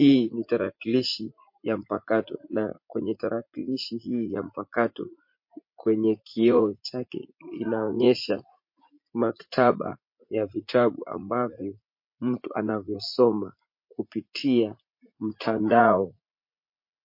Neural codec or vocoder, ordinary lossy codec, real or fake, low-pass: vocoder, 44.1 kHz, 80 mel bands, Vocos; MP3, 32 kbps; fake; 5.4 kHz